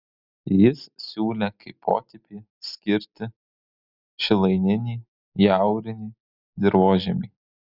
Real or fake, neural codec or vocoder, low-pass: real; none; 5.4 kHz